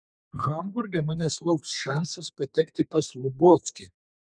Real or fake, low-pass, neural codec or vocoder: fake; 9.9 kHz; codec, 32 kHz, 1.9 kbps, SNAC